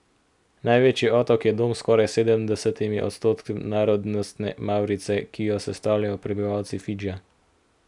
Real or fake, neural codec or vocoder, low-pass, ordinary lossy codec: real; none; 10.8 kHz; none